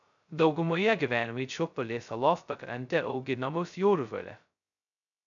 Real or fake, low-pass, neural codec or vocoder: fake; 7.2 kHz; codec, 16 kHz, 0.2 kbps, FocalCodec